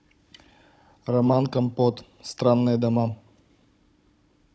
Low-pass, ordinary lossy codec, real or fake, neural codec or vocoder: none; none; fake; codec, 16 kHz, 16 kbps, FunCodec, trained on Chinese and English, 50 frames a second